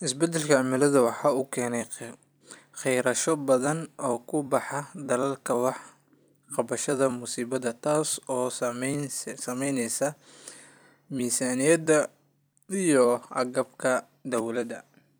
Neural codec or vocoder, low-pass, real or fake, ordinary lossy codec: vocoder, 44.1 kHz, 128 mel bands every 256 samples, BigVGAN v2; none; fake; none